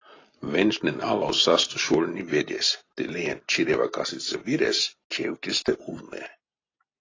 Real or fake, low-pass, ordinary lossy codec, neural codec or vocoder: real; 7.2 kHz; AAC, 32 kbps; none